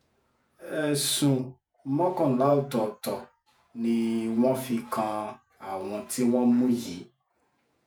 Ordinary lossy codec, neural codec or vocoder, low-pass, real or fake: none; vocoder, 48 kHz, 128 mel bands, Vocos; none; fake